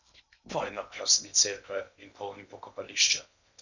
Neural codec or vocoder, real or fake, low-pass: codec, 16 kHz in and 24 kHz out, 0.8 kbps, FocalCodec, streaming, 65536 codes; fake; 7.2 kHz